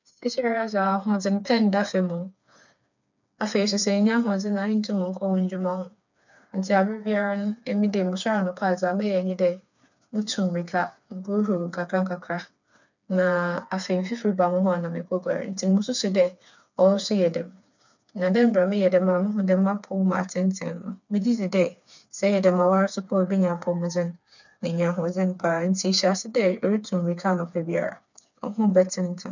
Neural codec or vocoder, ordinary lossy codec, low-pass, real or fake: codec, 16 kHz, 4 kbps, FreqCodec, smaller model; none; 7.2 kHz; fake